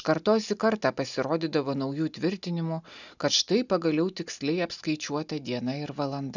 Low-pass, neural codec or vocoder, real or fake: 7.2 kHz; none; real